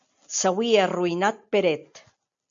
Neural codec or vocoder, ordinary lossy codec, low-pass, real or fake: none; AAC, 64 kbps; 7.2 kHz; real